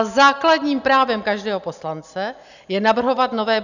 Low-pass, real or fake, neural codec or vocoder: 7.2 kHz; real; none